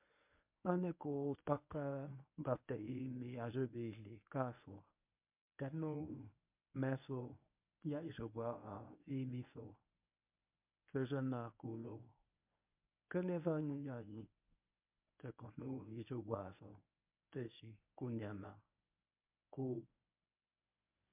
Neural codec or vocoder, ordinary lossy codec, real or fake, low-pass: codec, 24 kHz, 0.9 kbps, WavTokenizer, medium speech release version 1; MP3, 32 kbps; fake; 3.6 kHz